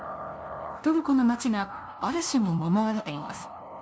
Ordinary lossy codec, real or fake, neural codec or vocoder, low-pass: none; fake; codec, 16 kHz, 0.5 kbps, FunCodec, trained on LibriTTS, 25 frames a second; none